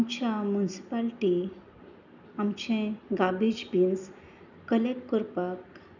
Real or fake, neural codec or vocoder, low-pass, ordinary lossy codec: real; none; 7.2 kHz; none